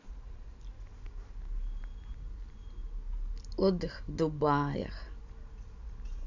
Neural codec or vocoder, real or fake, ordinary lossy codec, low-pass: none; real; none; 7.2 kHz